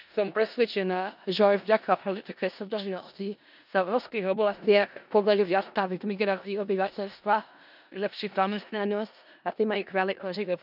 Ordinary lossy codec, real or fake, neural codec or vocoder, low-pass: none; fake; codec, 16 kHz in and 24 kHz out, 0.4 kbps, LongCat-Audio-Codec, four codebook decoder; 5.4 kHz